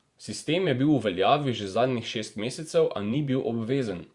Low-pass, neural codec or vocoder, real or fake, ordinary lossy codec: 10.8 kHz; vocoder, 24 kHz, 100 mel bands, Vocos; fake; Opus, 64 kbps